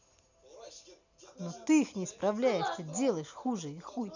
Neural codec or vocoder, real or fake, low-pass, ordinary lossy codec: none; real; 7.2 kHz; none